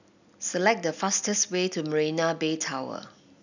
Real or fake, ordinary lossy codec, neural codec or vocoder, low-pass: real; none; none; 7.2 kHz